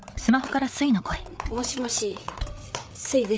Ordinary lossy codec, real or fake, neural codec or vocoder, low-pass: none; fake; codec, 16 kHz, 8 kbps, FreqCodec, larger model; none